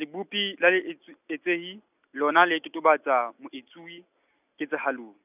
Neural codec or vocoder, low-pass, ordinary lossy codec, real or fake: none; 3.6 kHz; none; real